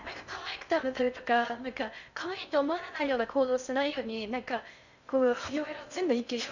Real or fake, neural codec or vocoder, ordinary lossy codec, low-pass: fake; codec, 16 kHz in and 24 kHz out, 0.6 kbps, FocalCodec, streaming, 2048 codes; none; 7.2 kHz